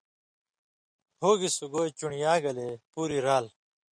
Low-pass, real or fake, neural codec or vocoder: 9.9 kHz; real; none